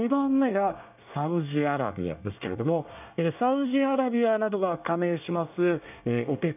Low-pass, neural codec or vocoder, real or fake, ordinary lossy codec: 3.6 kHz; codec, 24 kHz, 1 kbps, SNAC; fake; none